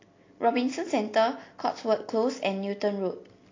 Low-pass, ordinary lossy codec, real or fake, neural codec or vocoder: 7.2 kHz; AAC, 32 kbps; real; none